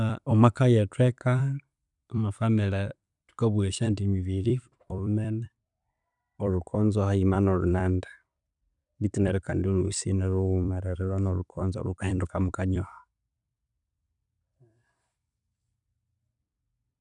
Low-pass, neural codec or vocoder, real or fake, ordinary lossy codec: 10.8 kHz; vocoder, 44.1 kHz, 128 mel bands every 256 samples, BigVGAN v2; fake; none